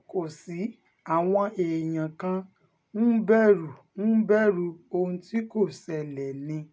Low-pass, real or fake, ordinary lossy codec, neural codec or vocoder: none; real; none; none